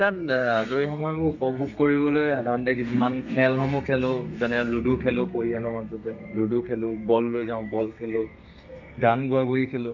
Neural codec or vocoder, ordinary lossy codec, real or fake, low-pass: codec, 32 kHz, 1.9 kbps, SNAC; none; fake; 7.2 kHz